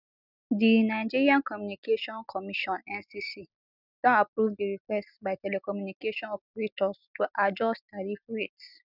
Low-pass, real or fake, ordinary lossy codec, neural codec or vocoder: 5.4 kHz; real; none; none